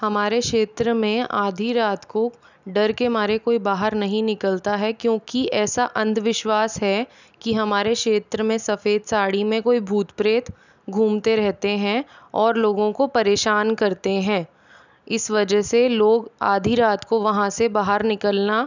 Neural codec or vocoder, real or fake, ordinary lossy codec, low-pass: none; real; none; 7.2 kHz